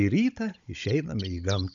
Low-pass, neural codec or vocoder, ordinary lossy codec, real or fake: 7.2 kHz; codec, 16 kHz, 16 kbps, FunCodec, trained on Chinese and English, 50 frames a second; MP3, 96 kbps; fake